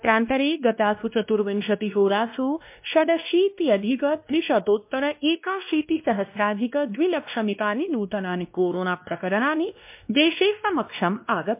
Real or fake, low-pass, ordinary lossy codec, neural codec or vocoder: fake; 3.6 kHz; MP3, 32 kbps; codec, 16 kHz, 1 kbps, X-Codec, WavLM features, trained on Multilingual LibriSpeech